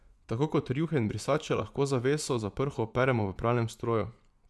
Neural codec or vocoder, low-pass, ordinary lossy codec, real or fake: vocoder, 24 kHz, 100 mel bands, Vocos; none; none; fake